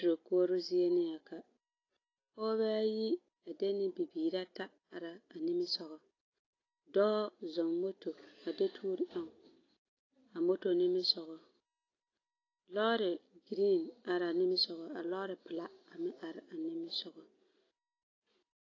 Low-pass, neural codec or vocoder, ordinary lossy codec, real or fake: 7.2 kHz; none; AAC, 32 kbps; real